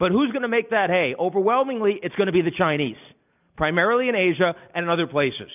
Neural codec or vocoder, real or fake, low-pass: none; real; 3.6 kHz